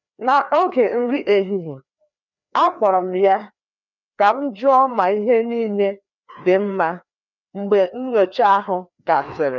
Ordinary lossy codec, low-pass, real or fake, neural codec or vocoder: none; 7.2 kHz; fake; codec, 16 kHz, 2 kbps, FreqCodec, larger model